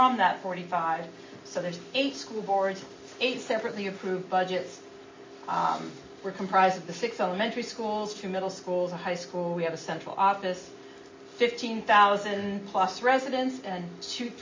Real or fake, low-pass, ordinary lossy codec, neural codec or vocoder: real; 7.2 kHz; MP3, 32 kbps; none